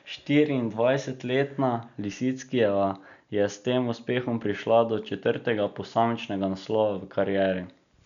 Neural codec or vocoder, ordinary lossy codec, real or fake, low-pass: none; none; real; 7.2 kHz